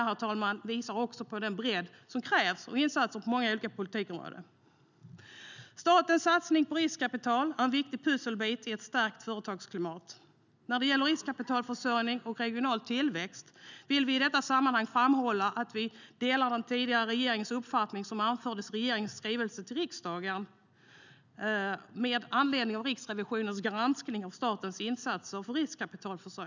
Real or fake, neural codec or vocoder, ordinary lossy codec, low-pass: real; none; none; 7.2 kHz